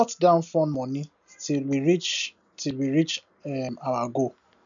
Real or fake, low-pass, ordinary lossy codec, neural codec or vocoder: real; 7.2 kHz; MP3, 96 kbps; none